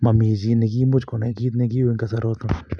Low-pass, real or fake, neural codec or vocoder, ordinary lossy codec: 9.9 kHz; real; none; none